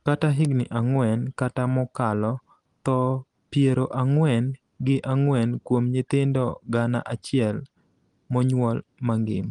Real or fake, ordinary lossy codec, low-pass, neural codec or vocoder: real; Opus, 24 kbps; 10.8 kHz; none